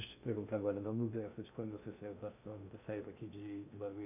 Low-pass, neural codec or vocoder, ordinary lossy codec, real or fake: 3.6 kHz; codec, 16 kHz in and 24 kHz out, 0.6 kbps, FocalCodec, streaming, 2048 codes; none; fake